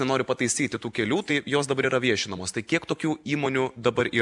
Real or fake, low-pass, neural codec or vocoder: real; 10.8 kHz; none